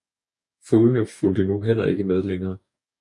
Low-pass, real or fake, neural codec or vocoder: 10.8 kHz; fake; codec, 44.1 kHz, 2.6 kbps, DAC